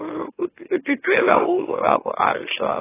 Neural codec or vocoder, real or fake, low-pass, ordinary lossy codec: autoencoder, 44.1 kHz, a latent of 192 numbers a frame, MeloTTS; fake; 3.6 kHz; AAC, 16 kbps